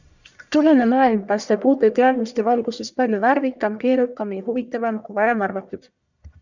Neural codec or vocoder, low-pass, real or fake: codec, 44.1 kHz, 1.7 kbps, Pupu-Codec; 7.2 kHz; fake